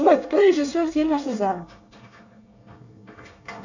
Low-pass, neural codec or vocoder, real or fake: 7.2 kHz; codec, 24 kHz, 1 kbps, SNAC; fake